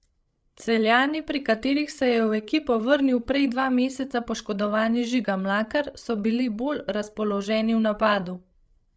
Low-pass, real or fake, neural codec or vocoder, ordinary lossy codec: none; fake; codec, 16 kHz, 8 kbps, FreqCodec, larger model; none